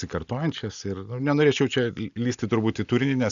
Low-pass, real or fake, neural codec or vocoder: 7.2 kHz; real; none